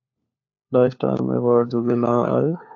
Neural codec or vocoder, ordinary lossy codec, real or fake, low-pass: codec, 16 kHz, 4 kbps, FunCodec, trained on LibriTTS, 50 frames a second; AAC, 48 kbps; fake; 7.2 kHz